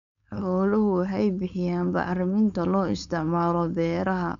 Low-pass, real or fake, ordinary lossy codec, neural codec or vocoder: 7.2 kHz; fake; none; codec, 16 kHz, 4.8 kbps, FACodec